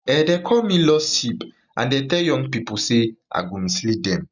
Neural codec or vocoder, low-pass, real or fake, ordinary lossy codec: none; 7.2 kHz; real; none